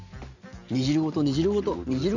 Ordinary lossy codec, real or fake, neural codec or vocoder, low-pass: none; real; none; 7.2 kHz